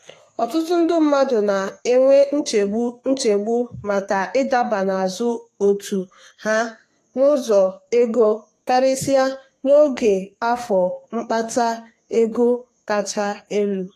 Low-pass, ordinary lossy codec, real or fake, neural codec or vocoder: 14.4 kHz; AAC, 48 kbps; fake; codec, 32 kHz, 1.9 kbps, SNAC